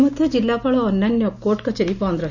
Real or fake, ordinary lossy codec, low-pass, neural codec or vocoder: real; none; 7.2 kHz; none